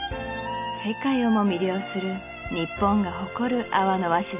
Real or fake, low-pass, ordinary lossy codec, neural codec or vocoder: real; 3.6 kHz; none; none